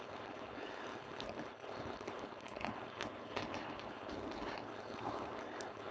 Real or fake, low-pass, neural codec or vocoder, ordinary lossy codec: fake; none; codec, 16 kHz, 4.8 kbps, FACodec; none